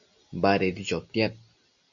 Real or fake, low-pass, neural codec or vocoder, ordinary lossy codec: real; 7.2 kHz; none; Opus, 64 kbps